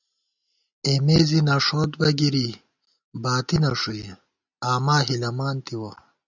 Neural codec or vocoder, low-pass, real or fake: none; 7.2 kHz; real